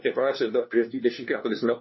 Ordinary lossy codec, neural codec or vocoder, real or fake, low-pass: MP3, 24 kbps; codec, 16 kHz, 1 kbps, FunCodec, trained on LibriTTS, 50 frames a second; fake; 7.2 kHz